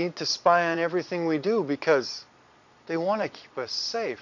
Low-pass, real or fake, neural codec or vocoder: 7.2 kHz; fake; vocoder, 22.05 kHz, 80 mel bands, Vocos